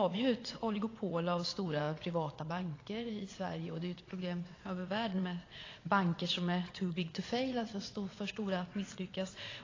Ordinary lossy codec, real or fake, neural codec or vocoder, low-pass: AAC, 32 kbps; fake; vocoder, 22.05 kHz, 80 mel bands, WaveNeXt; 7.2 kHz